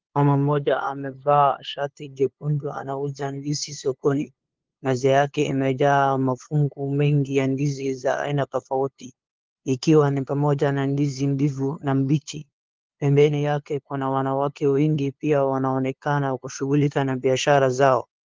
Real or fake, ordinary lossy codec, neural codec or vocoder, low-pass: fake; Opus, 16 kbps; codec, 16 kHz, 2 kbps, FunCodec, trained on LibriTTS, 25 frames a second; 7.2 kHz